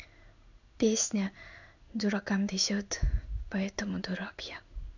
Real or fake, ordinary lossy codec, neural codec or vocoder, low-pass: fake; none; codec, 16 kHz in and 24 kHz out, 1 kbps, XY-Tokenizer; 7.2 kHz